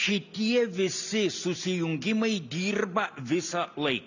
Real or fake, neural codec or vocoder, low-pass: real; none; 7.2 kHz